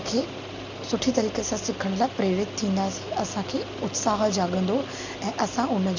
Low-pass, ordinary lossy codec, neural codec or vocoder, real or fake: 7.2 kHz; MP3, 64 kbps; none; real